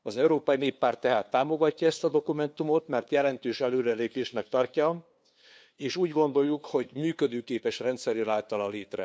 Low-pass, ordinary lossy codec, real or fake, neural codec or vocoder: none; none; fake; codec, 16 kHz, 2 kbps, FunCodec, trained on LibriTTS, 25 frames a second